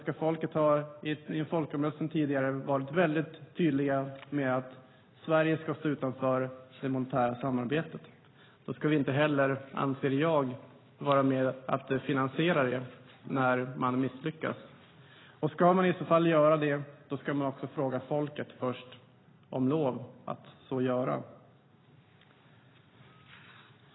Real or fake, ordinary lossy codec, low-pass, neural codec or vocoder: real; AAC, 16 kbps; 7.2 kHz; none